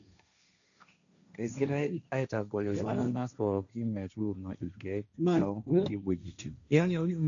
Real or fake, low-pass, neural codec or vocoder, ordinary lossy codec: fake; 7.2 kHz; codec, 16 kHz, 1.1 kbps, Voila-Tokenizer; none